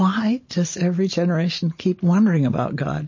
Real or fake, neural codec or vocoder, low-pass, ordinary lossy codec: real; none; 7.2 kHz; MP3, 32 kbps